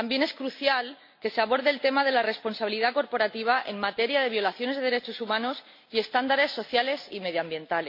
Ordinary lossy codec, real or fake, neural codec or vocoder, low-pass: MP3, 32 kbps; real; none; 5.4 kHz